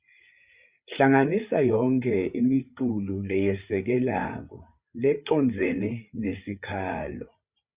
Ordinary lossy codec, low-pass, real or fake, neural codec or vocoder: Opus, 64 kbps; 3.6 kHz; fake; codec, 16 kHz, 8 kbps, FreqCodec, larger model